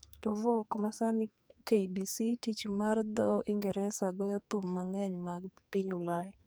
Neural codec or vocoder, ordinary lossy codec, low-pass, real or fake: codec, 44.1 kHz, 2.6 kbps, SNAC; none; none; fake